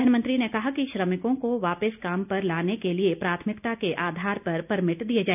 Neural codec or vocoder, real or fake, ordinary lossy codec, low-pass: none; real; none; 3.6 kHz